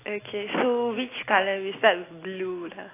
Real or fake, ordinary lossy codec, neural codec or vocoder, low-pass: real; AAC, 16 kbps; none; 3.6 kHz